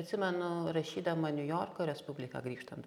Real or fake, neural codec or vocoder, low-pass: real; none; 19.8 kHz